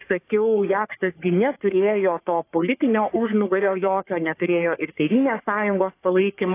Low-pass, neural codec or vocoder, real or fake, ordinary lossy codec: 3.6 kHz; codec, 44.1 kHz, 3.4 kbps, Pupu-Codec; fake; AAC, 24 kbps